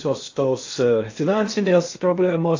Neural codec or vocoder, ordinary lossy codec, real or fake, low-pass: codec, 16 kHz in and 24 kHz out, 0.8 kbps, FocalCodec, streaming, 65536 codes; AAC, 48 kbps; fake; 7.2 kHz